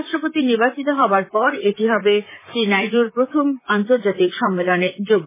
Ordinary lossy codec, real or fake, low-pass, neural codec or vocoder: MP3, 16 kbps; fake; 3.6 kHz; vocoder, 44.1 kHz, 128 mel bands, Pupu-Vocoder